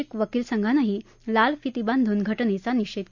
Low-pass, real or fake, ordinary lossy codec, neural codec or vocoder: 7.2 kHz; real; none; none